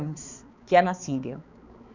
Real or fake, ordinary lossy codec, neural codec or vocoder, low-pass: fake; none; codec, 16 kHz, 4 kbps, X-Codec, HuBERT features, trained on balanced general audio; 7.2 kHz